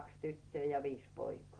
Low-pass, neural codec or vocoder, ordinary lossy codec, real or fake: 9.9 kHz; none; Opus, 16 kbps; real